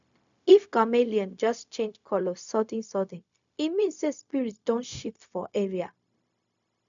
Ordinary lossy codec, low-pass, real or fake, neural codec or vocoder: none; 7.2 kHz; fake; codec, 16 kHz, 0.4 kbps, LongCat-Audio-Codec